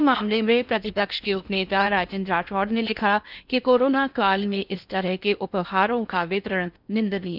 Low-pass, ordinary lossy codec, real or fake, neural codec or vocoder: 5.4 kHz; none; fake; codec, 16 kHz in and 24 kHz out, 0.6 kbps, FocalCodec, streaming, 4096 codes